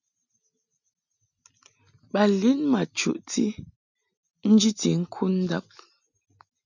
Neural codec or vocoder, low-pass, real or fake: none; 7.2 kHz; real